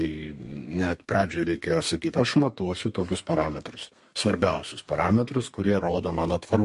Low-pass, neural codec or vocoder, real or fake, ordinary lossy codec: 14.4 kHz; codec, 32 kHz, 1.9 kbps, SNAC; fake; MP3, 48 kbps